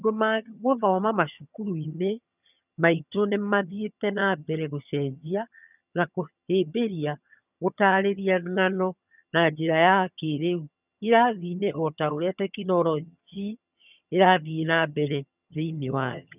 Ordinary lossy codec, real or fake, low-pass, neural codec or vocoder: none; fake; 3.6 kHz; vocoder, 22.05 kHz, 80 mel bands, HiFi-GAN